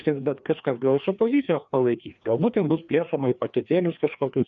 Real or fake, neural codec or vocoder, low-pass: fake; codec, 16 kHz, 2 kbps, FreqCodec, larger model; 7.2 kHz